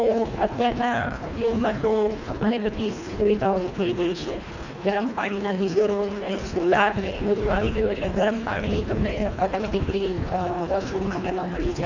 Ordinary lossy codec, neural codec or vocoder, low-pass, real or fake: none; codec, 24 kHz, 1.5 kbps, HILCodec; 7.2 kHz; fake